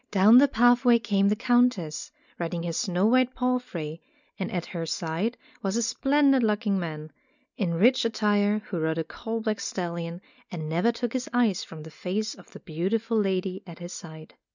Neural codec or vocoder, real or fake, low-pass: none; real; 7.2 kHz